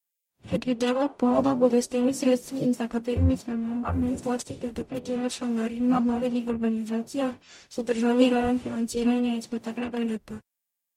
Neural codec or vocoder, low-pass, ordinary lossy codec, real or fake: codec, 44.1 kHz, 0.9 kbps, DAC; 19.8 kHz; MP3, 64 kbps; fake